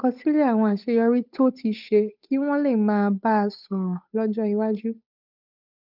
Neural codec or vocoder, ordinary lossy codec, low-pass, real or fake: codec, 16 kHz, 8 kbps, FunCodec, trained on Chinese and English, 25 frames a second; none; 5.4 kHz; fake